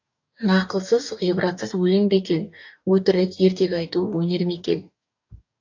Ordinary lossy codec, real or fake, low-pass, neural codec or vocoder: AAC, 48 kbps; fake; 7.2 kHz; codec, 44.1 kHz, 2.6 kbps, DAC